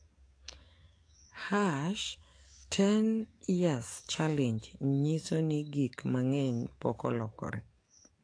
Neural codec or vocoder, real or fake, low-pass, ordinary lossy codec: codec, 44.1 kHz, 7.8 kbps, DAC; fake; 9.9 kHz; AAC, 48 kbps